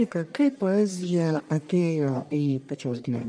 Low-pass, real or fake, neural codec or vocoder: 9.9 kHz; fake; codec, 44.1 kHz, 1.7 kbps, Pupu-Codec